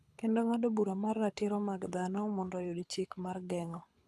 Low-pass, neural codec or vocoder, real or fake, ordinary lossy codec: none; codec, 24 kHz, 6 kbps, HILCodec; fake; none